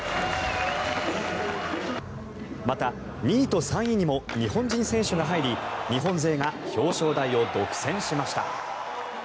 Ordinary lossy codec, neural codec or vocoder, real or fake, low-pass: none; none; real; none